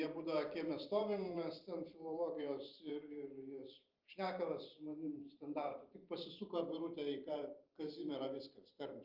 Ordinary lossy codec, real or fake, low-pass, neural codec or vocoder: Opus, 24 kbps; fake; 5.4 kHz; vocoder, 44.1 kHz, 128 mel bands every 512 samples, BigVGAN v2